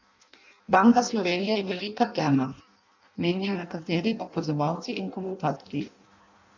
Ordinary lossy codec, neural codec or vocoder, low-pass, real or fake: none; codec, 16 kHz in and 24 kHz out, 0.6 kbps, FireRedTTS-2 codec; 7.2 kHz; fake